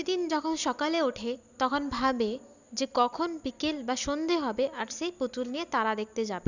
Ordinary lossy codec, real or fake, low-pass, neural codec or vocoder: none; real; 7.2 kHz; none